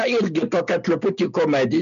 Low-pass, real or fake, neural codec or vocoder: 7.2 kHz; real; none